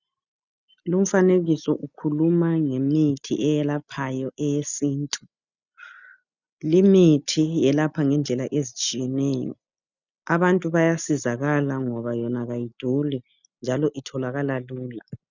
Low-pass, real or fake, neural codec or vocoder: 7.2 kHz; real; none